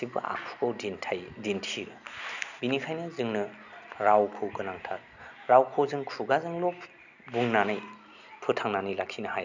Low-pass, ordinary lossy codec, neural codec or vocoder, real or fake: 7.2 kHz; none; none; real